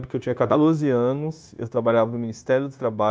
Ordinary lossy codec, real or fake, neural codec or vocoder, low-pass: none; fake; codec, 16 kHz, 0.9 kbps, LongCat-Audio-Codec; none